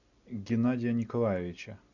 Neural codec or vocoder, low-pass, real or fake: none; 7.2 kHz; real